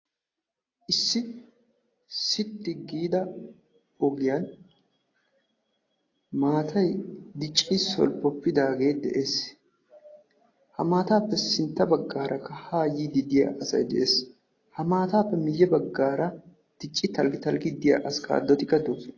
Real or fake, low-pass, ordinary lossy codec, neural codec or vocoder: real; 7.2 kHz; AAC, 32 kbps; none